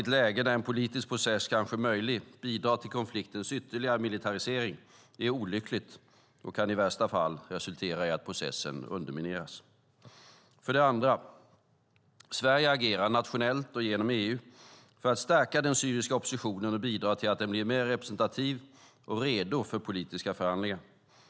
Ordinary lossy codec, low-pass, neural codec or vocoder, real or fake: none; none; none; real